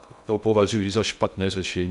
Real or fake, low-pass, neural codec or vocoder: fake; 10.8 kHz; codec, 16 kHz in and 24 kHz out, 0.6 kbps, FocalCodec, streaming, 2048 codes